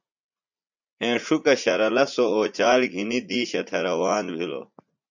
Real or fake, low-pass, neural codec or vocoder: fake; 7.2 kHz; vocoder, 44.1 kHz, 80 mel bands, Vocos